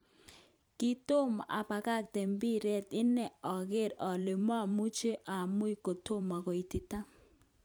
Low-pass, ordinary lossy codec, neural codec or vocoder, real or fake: none; none; vocoder, 44.1 kHz, 128 mel bands every 512 samples, BigVGAN v2; fake